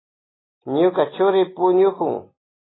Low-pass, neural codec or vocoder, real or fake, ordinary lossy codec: 7.2 kHz; none; real; AAC, 16 kbps